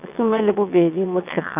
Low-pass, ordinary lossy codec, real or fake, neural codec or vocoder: 3.6 kHz; none; fake; vocoder, 22.05 kHz, 80 mel bands, WaveNeXt